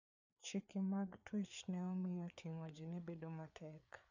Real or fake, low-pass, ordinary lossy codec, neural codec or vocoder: fake; 7.2 kHz; none; codec, 16 kHz, 4 kbps, FreqCodec, larger model